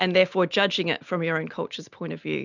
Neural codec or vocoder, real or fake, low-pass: none; real; 7.2 kHz